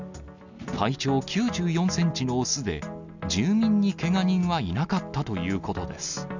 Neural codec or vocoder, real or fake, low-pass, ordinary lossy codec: none; real; 7.2 kHz; none